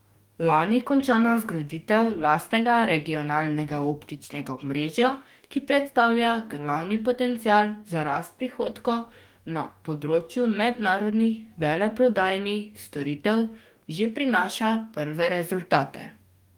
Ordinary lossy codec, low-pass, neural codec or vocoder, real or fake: Opus, 32 kbps; 19.8 kHz; codec, 44.1 kHz, 2.6 kbps, DAC; fake